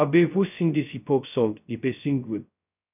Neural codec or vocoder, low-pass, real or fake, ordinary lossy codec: codec, 16 kHz, 0.2 kbps, FocalCodec; 3.6 kHz; fake; none